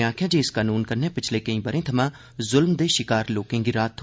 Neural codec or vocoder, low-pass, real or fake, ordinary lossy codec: none; none; real; none